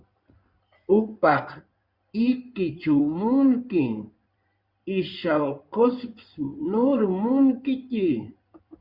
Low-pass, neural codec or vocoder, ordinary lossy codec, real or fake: 5.4 kHz; codec, 16 kHz in and 24 kHz out, 2.2 kbps, FireRedTTS-2 codec; Opus, 64 kbps; fake